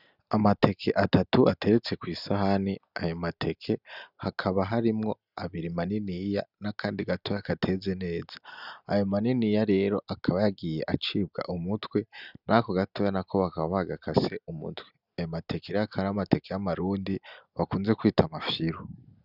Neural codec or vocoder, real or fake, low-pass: none; real; 5.4 kHz